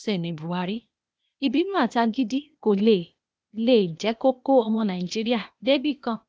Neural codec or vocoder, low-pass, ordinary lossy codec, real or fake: codec, 16 kHz, 0.8 kbps, ZipCodec; none; none; fake